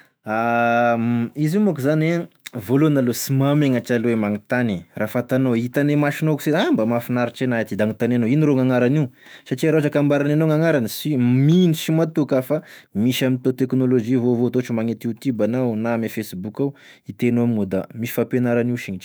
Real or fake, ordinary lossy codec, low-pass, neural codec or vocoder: fake; none; none; autoencoder, 48 kHz, 128 numbers a frame, DAC-VAE, trained on Japanese speech